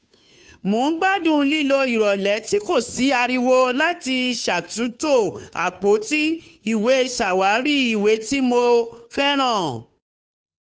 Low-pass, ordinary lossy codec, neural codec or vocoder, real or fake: none; none; codec, 16 kHz, 2 kbps, FunCodec, trained on Chinese and English, 25 frames a second; fake